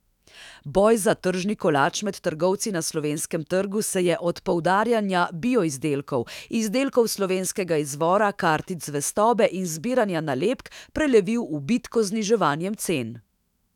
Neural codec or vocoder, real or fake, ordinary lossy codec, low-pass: autoencoder, 48 kHz, 128 numbers a frame, DAC-VAE, trained on Japanese speech; fake; none; 19.8 kHz